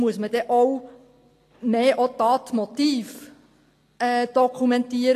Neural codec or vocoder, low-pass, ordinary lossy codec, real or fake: none; 14.4 kHz; AAC, 48 kbps; real